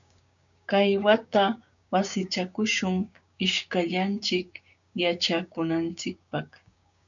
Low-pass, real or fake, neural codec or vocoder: 7.2 kHz; fake; codec, 16 kHz, 6 kbps, DAC